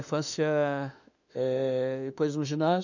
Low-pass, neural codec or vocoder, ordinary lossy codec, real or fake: 7.2 kHz; autoencoder, 48 kHz, 32 numbers a frame, DAC-VAE, trained on Japanese speech; none; fake